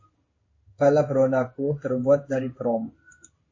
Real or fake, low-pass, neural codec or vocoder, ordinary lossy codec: fake; 7.2 kHz; codec, 16 kHz in and 24 kHz out, 1 kbps, XY-Tokenizer; MP3, 32 kbps